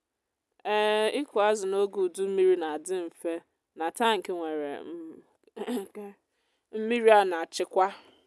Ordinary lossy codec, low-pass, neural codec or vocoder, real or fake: none; none; none; real